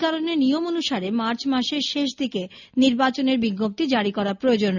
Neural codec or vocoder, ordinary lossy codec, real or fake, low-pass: none; none; real; 7.2 kHz